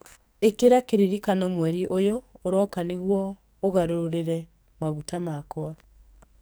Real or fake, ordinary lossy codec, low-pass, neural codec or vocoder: fake; none; none; codec, 44.1 kHz, 2.6 kbps, SNAC